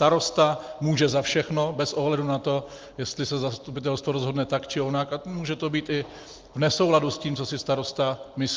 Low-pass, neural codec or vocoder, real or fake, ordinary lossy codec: 7.2 kHz; none; real; Opus, 24 kbps